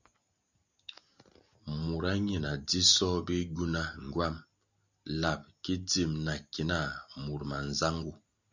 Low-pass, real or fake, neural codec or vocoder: 7.2 kHz; real; none